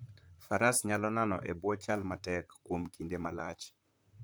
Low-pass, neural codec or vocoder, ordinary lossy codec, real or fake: none; vocoder, 44.1 kHz, 128 mel bands, Pupu-Vocoder; none; fake